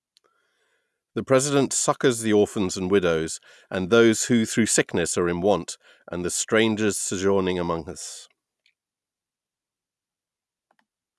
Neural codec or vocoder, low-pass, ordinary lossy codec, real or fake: none; none; none; real